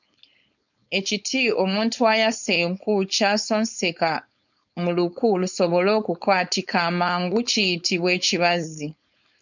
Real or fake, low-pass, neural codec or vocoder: fake; 7.2 kHz; codec, 16 kHz, 4.8 kbps, FACodec